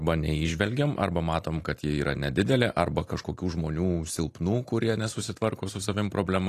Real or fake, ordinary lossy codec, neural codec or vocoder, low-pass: real; AAC, 48 kbps; none; 14.4 kHz